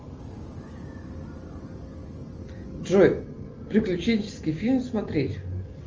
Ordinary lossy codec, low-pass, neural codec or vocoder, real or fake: Opus, 24 kbps; 7.2 kHz; none; real